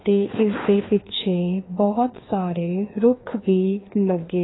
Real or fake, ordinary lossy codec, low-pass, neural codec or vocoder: fake; AAC, 16 kbps; 7.2 kHz; codec, 16 kHz, 2 kbps, FreqCodec, larger model